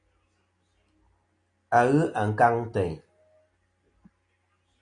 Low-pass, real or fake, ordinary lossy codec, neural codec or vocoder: 9.9 kHz; real; AAC, 48 kbps; none